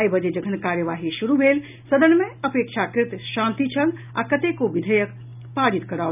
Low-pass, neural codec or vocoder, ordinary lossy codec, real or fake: 3.6 kHz; none; none; real